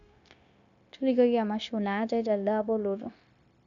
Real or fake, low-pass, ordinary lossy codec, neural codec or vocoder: fake; 7.2 kHz; none; codec, 16 kHz, 0.9 kbps, LongCat-Audio-Codec